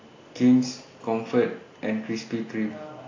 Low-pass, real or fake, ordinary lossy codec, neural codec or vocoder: 7.2 kHz; real; AAC, 32 kbps; none